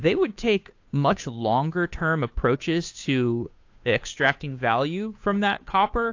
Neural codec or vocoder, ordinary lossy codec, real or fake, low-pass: codec, 16 kHz, 2 kbps, FunCodec, trained on Chinese and English, 25 frames a second; AAC, 48 kbps; fake; 7.2 kHz